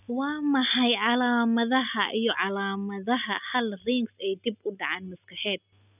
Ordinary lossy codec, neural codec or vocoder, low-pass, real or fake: none; none; 3.6 kHz; real